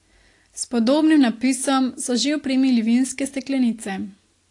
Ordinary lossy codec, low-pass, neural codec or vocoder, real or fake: AAC, 48 kbps; 10.8 kHz; none; real